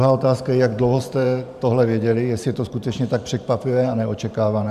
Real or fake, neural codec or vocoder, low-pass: fake; vocoder, 44.1 kHz, 128 mel bands every 512 samples, BigVGAN v2; 14.4 kHz